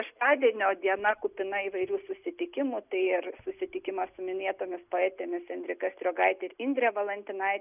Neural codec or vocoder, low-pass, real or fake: none; 3.6 kHz; real